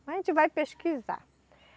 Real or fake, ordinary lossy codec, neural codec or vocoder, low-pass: real; none; none; none